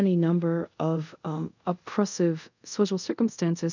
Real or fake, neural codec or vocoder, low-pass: fake; codec, 24 kHz, 0.5 kbps, DualCodec; 7.2 kHz